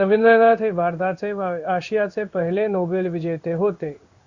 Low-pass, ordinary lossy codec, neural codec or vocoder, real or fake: 7.2 kHz; Opus, 64 kbps; codec, 16 kHz in and 24 kHz out, 1 kbps, XY-Tokenizer; fake